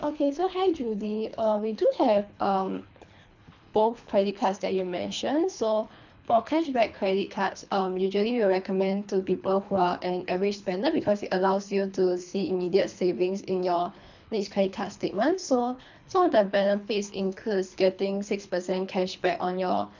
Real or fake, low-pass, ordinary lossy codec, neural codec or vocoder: fake; 7.2 kHz; none; codec, 24 kHz, 3 kbps, HILCodec